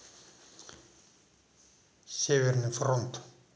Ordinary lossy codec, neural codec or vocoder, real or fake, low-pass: none; none; real; none